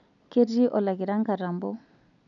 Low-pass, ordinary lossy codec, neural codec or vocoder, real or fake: 7.2 kHz; none; none; real